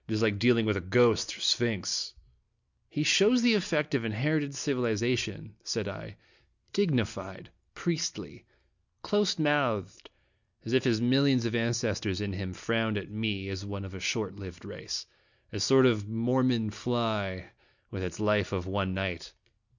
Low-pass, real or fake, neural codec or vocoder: 7.2 kHz; real; none